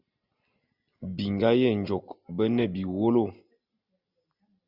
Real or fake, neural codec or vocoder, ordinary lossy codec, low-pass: real; none; Opus, 64 kbps; 5.4 kHz